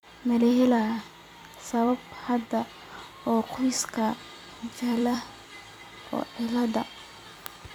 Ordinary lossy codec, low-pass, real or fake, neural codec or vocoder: none; 19.8 kHz; fake; vocoder, 44.1 kHz, 128 mel bands every 256 samples, BigVGAN v2